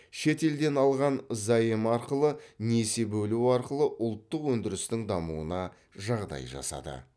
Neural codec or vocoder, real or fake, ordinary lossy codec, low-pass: none; real; none; none